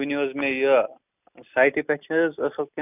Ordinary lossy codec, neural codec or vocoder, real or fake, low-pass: none; none; real; 3.6 kHz